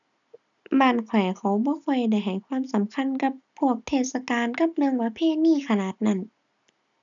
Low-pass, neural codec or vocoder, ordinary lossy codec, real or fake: 7.2 kHz; none; none; real